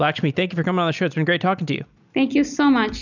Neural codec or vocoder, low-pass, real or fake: none; 7.2 kHz; real